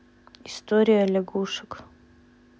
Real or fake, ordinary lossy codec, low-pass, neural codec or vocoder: real; none; none; none